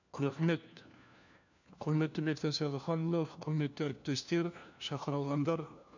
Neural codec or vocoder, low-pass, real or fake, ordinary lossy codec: codec, 16 kHz, 1 kbps, FunCodec, trained on LibriTTS, 50 frames a second; 7.2 kHz; fake; none